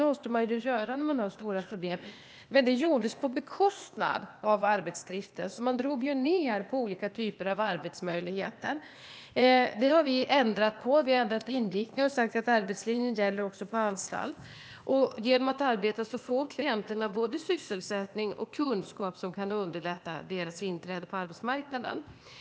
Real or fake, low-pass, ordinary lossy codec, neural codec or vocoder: fake; none; none; codec, 16 kHz, 0.8 kbps, ZipCodec